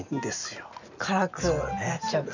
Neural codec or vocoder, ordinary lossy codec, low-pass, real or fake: none; none; 7.2 kHz; real